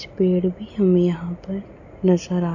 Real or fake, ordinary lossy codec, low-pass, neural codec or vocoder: real; none; 7.2 kHz; none